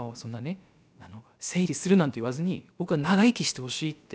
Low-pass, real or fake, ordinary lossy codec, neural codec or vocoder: none; fake; none; codec, 16 kHz, about 1 kbps, DyCAST, with the encoder's durations